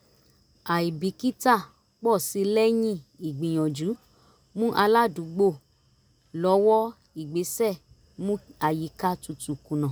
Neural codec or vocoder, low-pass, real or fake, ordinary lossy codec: none; none; real; none